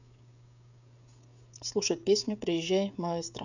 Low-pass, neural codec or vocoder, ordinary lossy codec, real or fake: 7.2 kHz; codec, 16 kHz, 16 kbps, FreqCodec, smaller model; none; fake